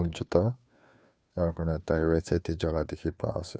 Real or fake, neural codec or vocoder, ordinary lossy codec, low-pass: fake; codec, 16 kHz, 2 kbps, FunCodec, trained on Chinese and English, 25 frames a second; none; none